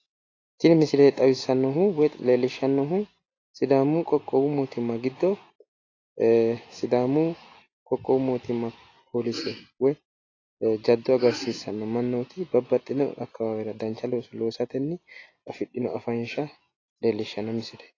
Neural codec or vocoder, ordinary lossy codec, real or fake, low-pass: none; AAC, 32 kbps; real; 7.2 kHz